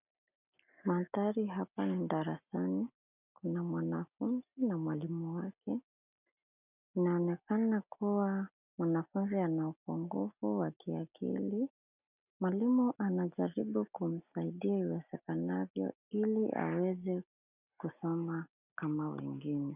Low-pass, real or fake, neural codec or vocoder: 3.6 kHz; real; none